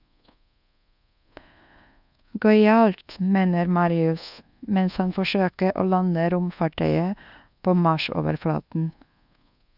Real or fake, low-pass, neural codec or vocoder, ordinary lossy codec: fake; 5.4 kHz; codec, 24 kHz, 1.2 kbps, DualCodec; none